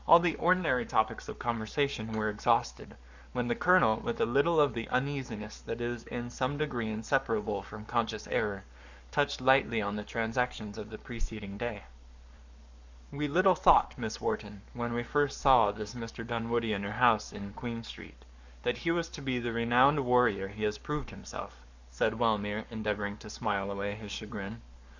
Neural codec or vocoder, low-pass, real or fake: codec, 44.1 kHz, 7.8 kbps, Pupu-Codec; 7.2 kHz; fake